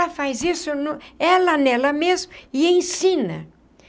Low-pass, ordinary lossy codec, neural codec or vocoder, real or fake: none; none; none; real